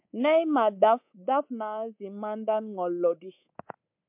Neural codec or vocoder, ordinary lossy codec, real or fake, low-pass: codec, 24 kHz, 3.1 kbps, DualCodec; MP3, 32 kbps; fake; 3.6 kHz